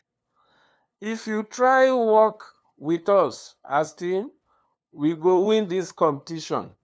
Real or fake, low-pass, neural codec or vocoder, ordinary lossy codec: fake; none; codec, 16 kHz, 2 kbps, FunCodec, trained on LibriTTS, 25 frames a second; none